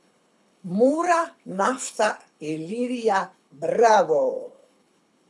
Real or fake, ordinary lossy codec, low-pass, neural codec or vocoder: fake; none; none; codec, 24 kHz, 6 kbps, HILCodec